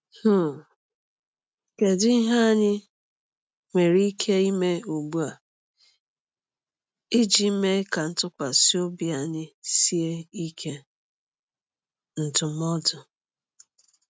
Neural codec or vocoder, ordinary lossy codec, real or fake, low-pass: none; none; real; none